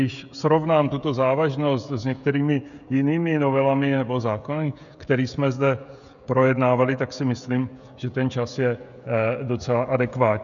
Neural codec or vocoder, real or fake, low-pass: codec, 16 kHz, 16 kbps, FreqCodec, smaller model; fake; 7.2 kHz